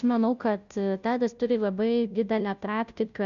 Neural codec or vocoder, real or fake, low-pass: codec, 16 kHz, 0.5 kbps, FunCodec, trained on Chinese and English, 25 frames a second; fake; 7.2 kHz